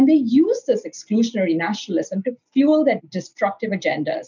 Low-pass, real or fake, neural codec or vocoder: 7.2 kHz; real; none